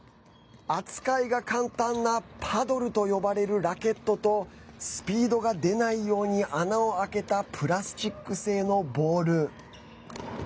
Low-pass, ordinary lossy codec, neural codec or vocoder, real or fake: none; none; none; real